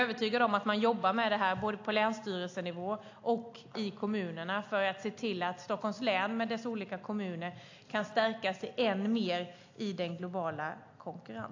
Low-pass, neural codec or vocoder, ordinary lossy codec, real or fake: 7.2 kHz; none; AAC, 48 kbps; real